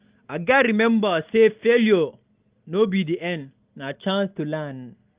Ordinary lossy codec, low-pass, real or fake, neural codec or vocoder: Opus, 24 kbps; 3.6 kHz; real; none